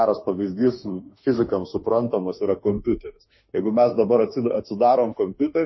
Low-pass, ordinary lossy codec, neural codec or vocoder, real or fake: 7.2 kHz; MP3, 24 kbps; autoencoder, 48 kHz, 32 numbers a frame, DAC-VAE, trained on Japanese speech; fake